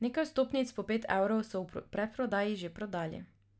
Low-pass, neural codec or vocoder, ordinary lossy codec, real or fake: none; none; none; real